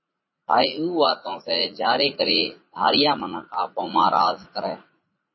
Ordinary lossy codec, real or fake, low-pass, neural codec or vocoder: MP3, 24 kbps; fake; 7.2 kHz; vocoder, 44.1 kHz, 80 mel bands, Vocos